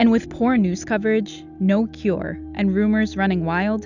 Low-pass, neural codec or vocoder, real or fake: 7.2 kHz; none; real